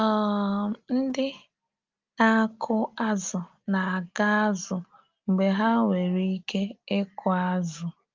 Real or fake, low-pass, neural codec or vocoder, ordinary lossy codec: real; 7.2 kHz; none; Opus, 32 kbps